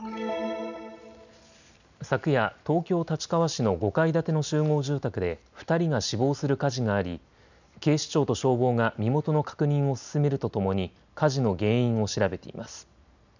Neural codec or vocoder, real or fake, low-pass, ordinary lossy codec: none; real; 7.2 kHz; none